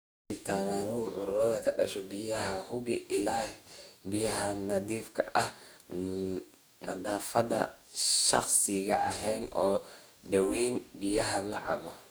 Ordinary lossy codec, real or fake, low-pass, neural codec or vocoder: none; fake; none; codec, 44.1 kHz, 2.6 kbps, DAC